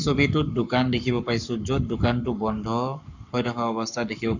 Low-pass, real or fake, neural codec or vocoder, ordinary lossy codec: 7.2 kHz; fake; codec, 44.1 kHz, 7.8 kbps, Pupu-Codec; none